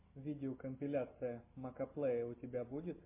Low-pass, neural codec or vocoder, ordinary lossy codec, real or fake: 3.6 kHz; none; MP3, 24 kbps; real